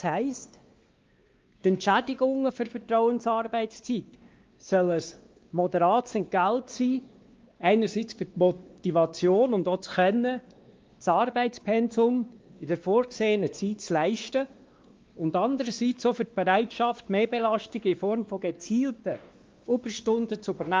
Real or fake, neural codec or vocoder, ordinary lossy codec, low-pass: fake; codec, 16 kHz, 2 kbps, X-Codec, WavLM features, trained on Multilingual LibriSpeech; Opus, 32 kbps; 7.2 kHz